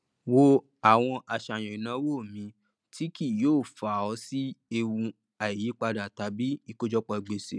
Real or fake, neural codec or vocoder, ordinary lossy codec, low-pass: real; none; none; none